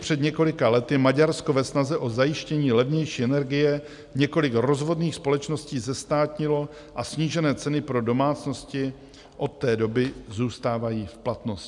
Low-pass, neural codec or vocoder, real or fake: 10.8 kHz; none; real